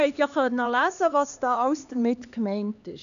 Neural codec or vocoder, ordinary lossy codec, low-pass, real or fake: codec, 16 kHz, 2 kbps, X-Codec, HuBERT features, trained on LibriSpeech; none; 7.2 kHz; fake